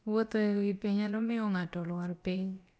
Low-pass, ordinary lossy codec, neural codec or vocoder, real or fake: none; none; codec, 16 kHz, about 1 kbps, DyCAST, with the encoder's durations; fake